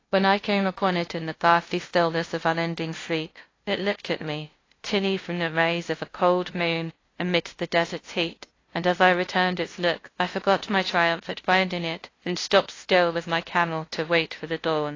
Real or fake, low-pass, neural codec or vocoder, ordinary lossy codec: fake; 7.2 kHz; codec, 16 kHz, 0.5 kbps, FunCodec, trained on LibriTTS, 25 frames a second; AAC, 32 kbps